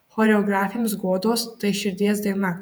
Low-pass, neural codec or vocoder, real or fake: 19.8 kHz; vocoder, 48 kHz, 128 mel bands, Vocos; fake